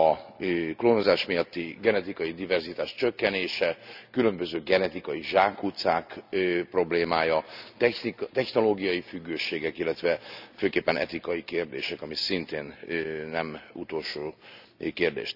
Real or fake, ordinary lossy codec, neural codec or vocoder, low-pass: real; none; none; 5.4 kHz